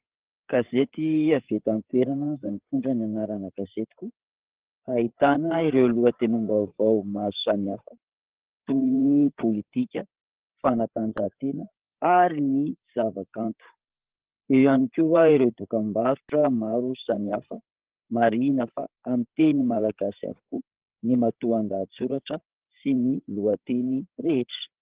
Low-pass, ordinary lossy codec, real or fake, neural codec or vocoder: 3.6 kHz; Opus, 16 kbps; fake; codec, 16 kHz, 8 kbps, FreqCodec, larger model